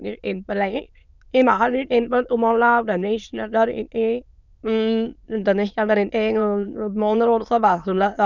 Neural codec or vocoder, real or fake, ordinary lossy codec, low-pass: autoencoder, 22.05 kHz, a latent of 192 numbers a frame, VITS, trained on many speakers; fake; none; 7.2 kHz